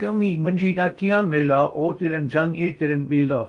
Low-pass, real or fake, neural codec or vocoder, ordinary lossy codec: 10.8 kHz; fake; codec, 16 kHz in and 24 kHz out, 0.6 kbps, FocalCodec, streaming, 4096 codes; Opus, 32 kbps